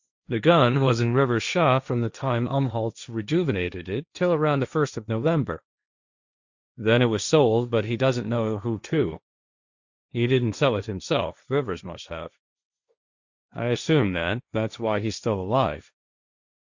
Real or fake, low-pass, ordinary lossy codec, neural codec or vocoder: fake; 7.2 kHz; Opus, 64 kbps; codec, 16 kHz, 1.1 kbps, Voila-Tokenizer